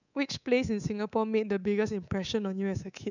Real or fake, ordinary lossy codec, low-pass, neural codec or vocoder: fake; none; 7.2 kHz; codec, 24 kHz, 3.1 kbps, DualCodec